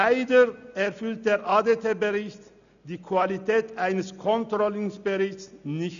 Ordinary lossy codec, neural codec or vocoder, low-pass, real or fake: none; none; 7.2 kHz; real